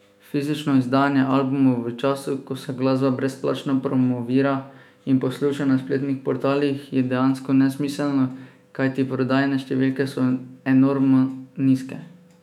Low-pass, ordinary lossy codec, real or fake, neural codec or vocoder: 19.8 kHz; none; fake; autoencoder, 48 kHz, 128 numbers a frame, DAC-VAE, trained on Japanese speech